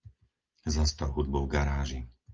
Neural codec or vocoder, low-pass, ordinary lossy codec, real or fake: none; 7.2 kHz; Opus, 24 kbps; real